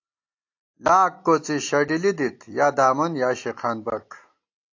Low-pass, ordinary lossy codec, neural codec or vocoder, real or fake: 7.2 kHz; AAC, 48 kbps; none; real